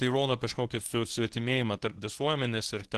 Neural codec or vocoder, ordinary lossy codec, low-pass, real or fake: codec, 24 kHz, 0.9 kbps, WavTokenizer, medium speech release version 1; Opus, 16 kbps; 10.8 kHz; fake